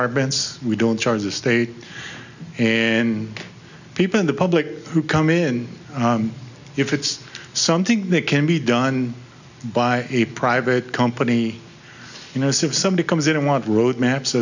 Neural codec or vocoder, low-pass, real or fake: none; 7.2 kHz; real